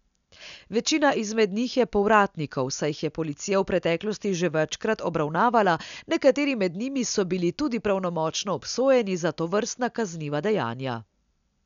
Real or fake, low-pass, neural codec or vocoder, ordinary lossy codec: real; 7.2 kHz; none; none